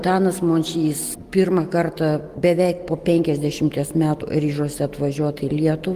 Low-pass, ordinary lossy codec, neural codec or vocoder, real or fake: 14.4 kHz; Opus, 24 kbps; none; real